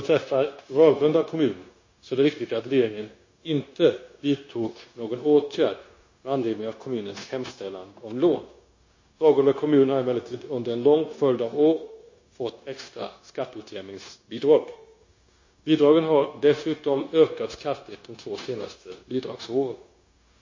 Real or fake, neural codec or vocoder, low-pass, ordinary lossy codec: fake; codec, 16 kHz, 0.9 kbps, LongCat-Audio-Codec; 7.2 kHz; MP3, 32 kbps